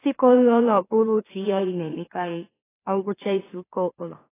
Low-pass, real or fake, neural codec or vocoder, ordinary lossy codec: 3.6 kHz; fake; autoencoder, 44.1 kHz, a latent of 192 numbers a frame, MeloTTS; AAC, 16 kbps